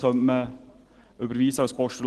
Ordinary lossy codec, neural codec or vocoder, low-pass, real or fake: Opus, 16 kbps; none; 10.8 kHz; real